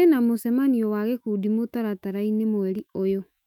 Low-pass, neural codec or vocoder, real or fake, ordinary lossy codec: 19.8 kHz; autoencoder, 48 kHz, 128 numbers a frame, DAC-VAE, trained on Japanese speech; fake; none